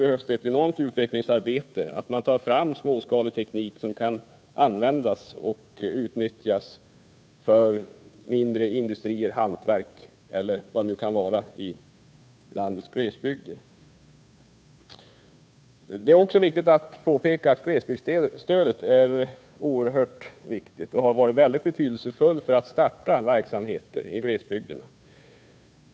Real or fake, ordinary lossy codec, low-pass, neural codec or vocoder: fake; none; none; codec, 16 kHz, 2 kbps, FunCodec, trained on Chinese and English, 25 frames a second